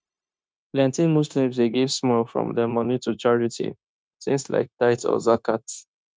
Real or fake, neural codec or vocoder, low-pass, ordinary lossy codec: fake; codec, 16 kHz, 0.9 kbps, LongCat-Audio-Codec; none; none